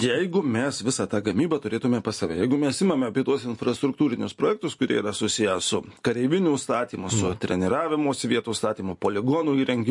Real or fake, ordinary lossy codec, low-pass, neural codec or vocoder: real; MP3, 48 kbps; 10.8 kHz; none